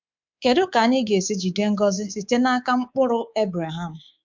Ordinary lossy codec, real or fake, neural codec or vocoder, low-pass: MP3, 64 kbps; fake; codec, 24 kHz, 3.1 kbps, DualCodec; 7.2 kHz